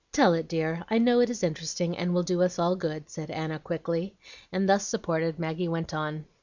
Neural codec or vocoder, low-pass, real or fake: none; 7.2 kHz; real